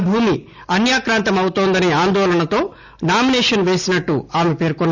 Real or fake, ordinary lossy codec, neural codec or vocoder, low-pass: real; none; none; 7.2 kHz